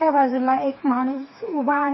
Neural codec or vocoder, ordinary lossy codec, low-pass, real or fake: codec, 16 kHz, 4 kbps, FreqCodec, smaller model; MP3, 24 kbps; 7.2 kHz; fake